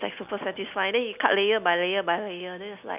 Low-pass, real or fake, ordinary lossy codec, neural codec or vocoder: 3.6 kHz; real; none; none